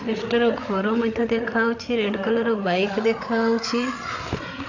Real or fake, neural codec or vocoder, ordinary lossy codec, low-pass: fake; codec, 16 kHz, 8 kbps, FreqCodec, larger model; AAC, 48 kbps; 7.2 kHz